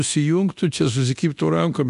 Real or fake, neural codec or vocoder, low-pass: fake; codec, 24 kHz, 0.9 kbps, DualCodec; 10.8 kHz